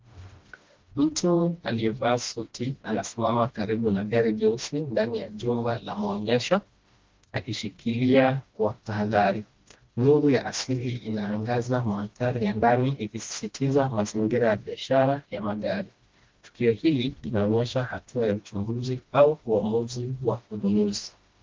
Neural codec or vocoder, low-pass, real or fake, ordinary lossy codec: codec, 16 kHz, 1 kbps, FreqCodec, smaller model; 7.2 kHz; fake; Opus, 32 kbps